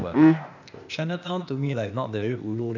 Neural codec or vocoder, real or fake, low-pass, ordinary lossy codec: codec, 16 kHz, 0.8 kbps, ZipCodec; fake; 7.2 kHz; none